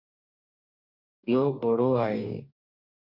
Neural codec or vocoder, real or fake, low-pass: codec, 44.1 kHz, 2.6 kbps, DAC; fake; 5.4 kHz